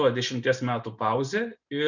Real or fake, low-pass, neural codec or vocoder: real; 7.2 kHz; none